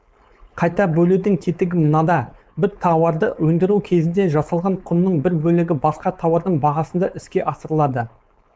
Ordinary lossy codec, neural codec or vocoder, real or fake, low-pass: none; codec, 16 kHz, 4.8 kbps, FACodec; fake; none